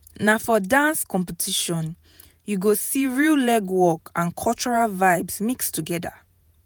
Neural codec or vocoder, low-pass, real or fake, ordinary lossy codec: vocoder, 48 kHz, 128 mel bands, Vocos; none; fake; none